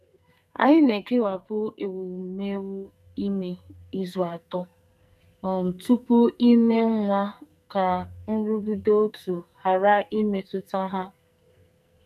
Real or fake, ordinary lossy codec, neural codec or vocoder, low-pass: fake; none; codec, 44.1 kHz, 2.6 kbps, SNAC; 14.4 kHz